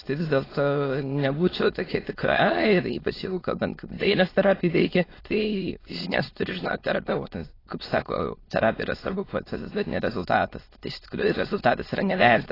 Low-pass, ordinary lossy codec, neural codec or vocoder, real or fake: 5.4 kHz; AAC, 24 kbps; autoencoder, 22.05 kHz, a latent of 192 numbers a frame, VITS, trained on many speakers; fake